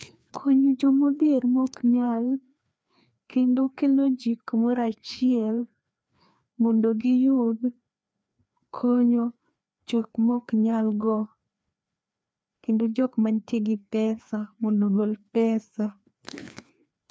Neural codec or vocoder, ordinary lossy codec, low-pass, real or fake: codec, 16 kHz, 2 kbps, FreqCodec, larger model; none; none; fake